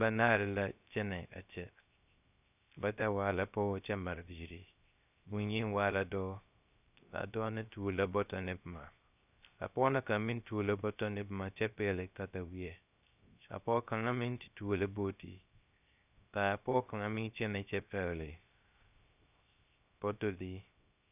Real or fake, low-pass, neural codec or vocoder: fake; 3.6 kHz; codec, 16 kHz, 0.3 kbps, FocalCodec